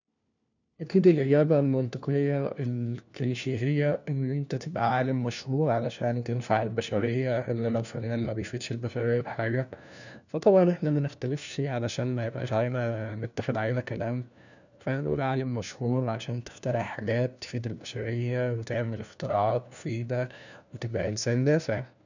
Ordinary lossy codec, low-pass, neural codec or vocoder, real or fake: none; 7.2 kHz; codec, 16 kHz, 1 kbps, FunCodec, trained on LibriTTS, 50 frames a second; fake